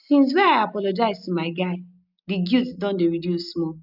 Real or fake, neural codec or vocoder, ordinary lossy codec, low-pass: real; none; none; 5.4 kHz